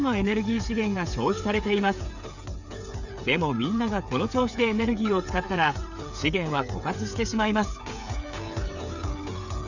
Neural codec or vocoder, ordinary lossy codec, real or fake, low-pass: codec, 16 kHz, 8 kbps, FreqCodec, smaller model; none; fake; 7.2 kHz